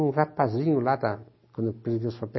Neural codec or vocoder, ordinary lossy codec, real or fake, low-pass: none; MP3, 24 kbps; real; 7.2 kHz